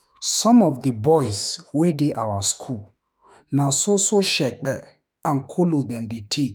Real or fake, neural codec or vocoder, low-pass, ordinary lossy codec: fake; autoencoder, 48 kHz, 32 numbers a frame, DAC-VAE, trained on Japanese speech; none; none